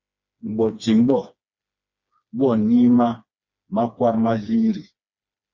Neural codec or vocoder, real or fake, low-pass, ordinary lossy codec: codec, 16 kHz, 2 kbps, FreqCodec, smaller model; fake; 7.2 kHz; Opus, 64 kbps